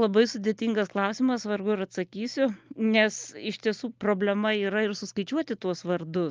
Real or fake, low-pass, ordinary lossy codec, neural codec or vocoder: real; 7.2 kHz; Opus, 32 kbps; none